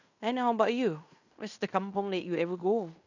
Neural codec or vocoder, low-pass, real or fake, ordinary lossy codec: codec, 16 kHz in and 24 kHz out, 0.9 kbps, LongCat-Audio-Codec, fine tuned four codebook decoder; 7.2 kHz; fake; none